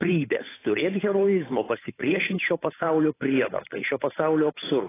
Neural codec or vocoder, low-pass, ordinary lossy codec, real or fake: codec, 16 kHz, 8 kbps, FunCodec, trained on Chinese and English, 25 frames a second; 3.6 kHz; AAC, 16 kbps; fake